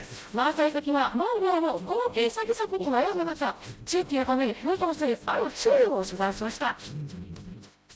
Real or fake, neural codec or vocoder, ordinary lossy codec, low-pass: fake; codec, 16 kHz, 0.5 kbps, FreqCodec, smaller model; none; none